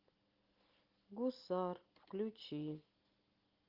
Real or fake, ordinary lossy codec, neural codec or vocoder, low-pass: real; Opus, 32 kbps; none; 5.4 kHz